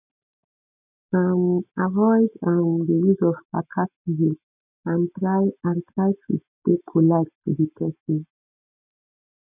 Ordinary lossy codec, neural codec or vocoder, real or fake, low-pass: none; none; real; 3.6 kHz